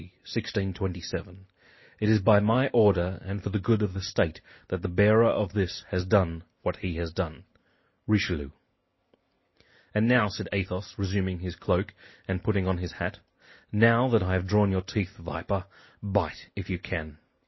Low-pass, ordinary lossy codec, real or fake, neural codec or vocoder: 7.2 kHz; MP3, 24 kbps; real; none